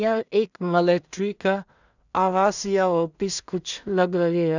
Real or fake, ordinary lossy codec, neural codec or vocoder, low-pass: fake; none; codec, 16 kHz in and 24 kHz out, 0.4 kbps, LongCat-Audio-Codec, two codebook decoder; 7.2 kHz